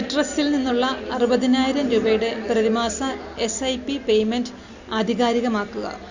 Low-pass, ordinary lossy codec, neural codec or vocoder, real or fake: 7.2 kHz; Opus, 64 kbps; none; real